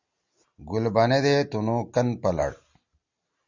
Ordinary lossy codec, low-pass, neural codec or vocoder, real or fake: Opus, 64 kbps; 7.2 kHz; none; real